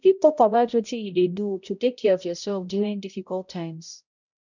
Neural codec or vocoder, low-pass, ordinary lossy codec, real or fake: codec, 16 kHz, 0.5 kbps, X-Codec, HuBERT features, trained on balanced general audio; 7.2 kHz; none; fake